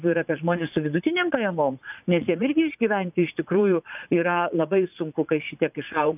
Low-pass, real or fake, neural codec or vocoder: 3.6 kHz; fake; vocoder, 44.1 kHz, 80 mel bands, Vocos